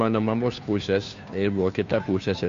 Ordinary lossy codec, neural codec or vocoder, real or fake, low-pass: MP3, 48 kbps; codec, 16 kHz, 2 kbps, FunCodec, trained on Chinese and English, 25 frames a second; fake; 7.2 kHz